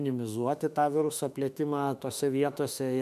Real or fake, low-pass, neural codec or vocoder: fake; 14.4 kHz; autoencoder, 48 kHz, 32 numbers a frame, DAC-VAE, trained on Japanese speech